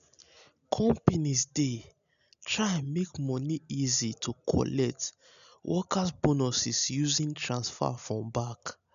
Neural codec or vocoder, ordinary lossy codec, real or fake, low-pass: none; none; real; 7.2 kHz